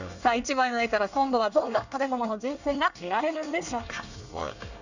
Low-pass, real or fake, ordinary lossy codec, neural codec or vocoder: 7.2 kHz; fake; none; codec, 24 kHz, 1 kbps, SNAC